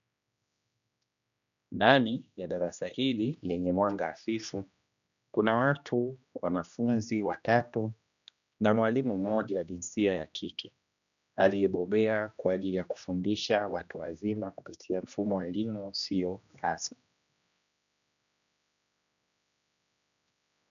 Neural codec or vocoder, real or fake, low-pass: codec, 16 kHz, 1 kbps, X-Codec, HuBERT features, trained on general audio; fake; 7.2 kHz